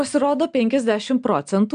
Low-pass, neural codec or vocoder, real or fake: 9.9 kHz; none; real